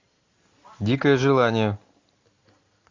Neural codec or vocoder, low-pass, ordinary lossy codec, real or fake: none; 7.2 kHz; MP3, 48 kbps; real